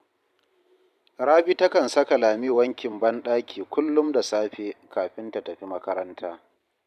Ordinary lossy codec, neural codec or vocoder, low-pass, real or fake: none; vocoder, 44.1 kHz, 128 mel bands every 512 samples, BigVGAN v2; 14.4 kHz; fake